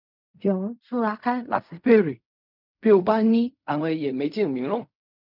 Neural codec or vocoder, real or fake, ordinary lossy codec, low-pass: codec, 16 kHz in and 24 kHz out, 0.4 kbps, LongCat-Audio-Codec, fine tuned four codebook decoder; fake; none; 5.4 kHz